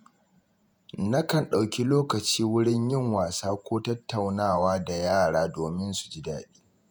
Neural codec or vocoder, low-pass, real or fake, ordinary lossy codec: vocoder, 48 kHz, 128 mel bands, Vocos; none; fake; none